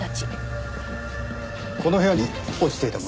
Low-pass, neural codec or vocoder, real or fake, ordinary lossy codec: none; none; real; none